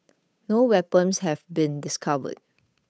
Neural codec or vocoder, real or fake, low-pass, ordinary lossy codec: codec, 16 kHz, 8 kbps, FunCodec, trained on Chinese and English, 25 frames a second; fake; none; none